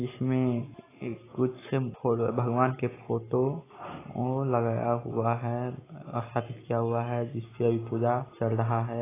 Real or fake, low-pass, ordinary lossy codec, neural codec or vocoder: real; 3.6 kHz; AAC, 16 kbps; none